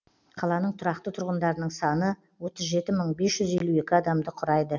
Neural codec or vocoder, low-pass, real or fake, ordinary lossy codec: none; 7.2 kHz; real; none